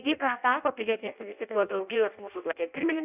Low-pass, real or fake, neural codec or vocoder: 3.6 kHz; fake; codec, 16 kHz in and 24 kHz out, 0.6 kbps, FireRedTTS-2 codec